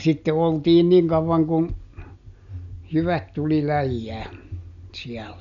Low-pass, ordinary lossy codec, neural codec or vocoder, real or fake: 7.2 kHz; none; none; real